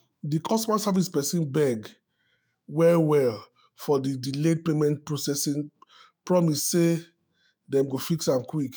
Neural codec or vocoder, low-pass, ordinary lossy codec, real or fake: autoencoder, 48 kHz, 128 numbers a frame, DAC-VAE, trained on Japanese speech; none; none; fake